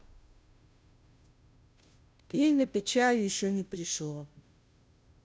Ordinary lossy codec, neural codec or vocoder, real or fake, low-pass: none; codec, 16 kHz, 0.5 kbps, FunCodec, trained on Chinese and English, 25 frames a second; fake; none